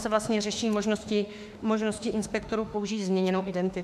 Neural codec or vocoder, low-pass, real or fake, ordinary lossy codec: autoencoder, 48 kHz, 32 numbers a frame, DAC-VAE, trained on Japanese speech; 14.4 kHz; fake; AAC, 96 kbps